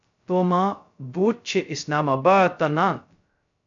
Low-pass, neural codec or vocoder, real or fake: 7.2 kHz; codec, 16 kHz, 0.2 kbps, FocalCodec; fake